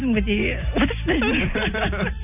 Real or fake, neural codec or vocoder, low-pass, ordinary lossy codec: real; none; 3.6 kHz; none